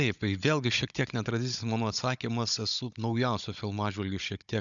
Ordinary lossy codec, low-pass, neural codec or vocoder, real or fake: MP3, 96 kbps; 7.2 kHz; codec, 16 kHz, 16 kbps, FunCodec, trained on Chinese and English, 50 frames a second; fake